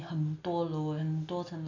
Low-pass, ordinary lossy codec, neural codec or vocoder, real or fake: 7.2 kHz; none; none; real